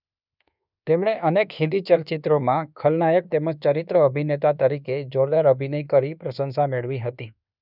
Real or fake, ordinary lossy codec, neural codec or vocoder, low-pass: fake; none; codec, 24 kHz, 1.2 kbps, DualCodec; 5.4 kHz